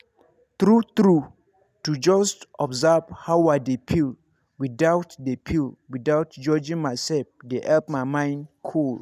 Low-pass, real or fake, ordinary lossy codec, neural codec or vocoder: 14.4 kHz; real; none; none